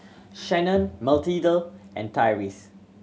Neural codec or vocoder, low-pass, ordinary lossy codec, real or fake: none; none; none; real